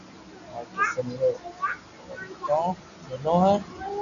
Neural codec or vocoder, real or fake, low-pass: none; real; 7.2 kHz